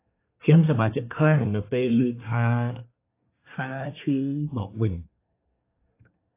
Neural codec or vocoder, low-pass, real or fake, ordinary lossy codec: codec, 24 kHz, 1 kbps, SNAC; 3.6 kHz; fake; AAC, 24 kbps